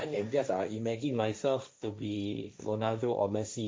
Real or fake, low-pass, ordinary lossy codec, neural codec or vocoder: fake; none; none; codec, 16 kHz, 1.1 kbps, Voila-Tokenizer